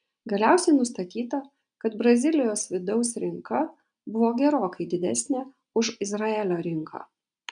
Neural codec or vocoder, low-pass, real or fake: none; 9.9 kHz; real